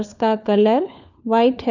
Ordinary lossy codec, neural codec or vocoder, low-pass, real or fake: none; codec, 16 kHz, 4 kbps, FunCodec, trained on LibriTTS, 50 frames a second; 7.2 kHz; fake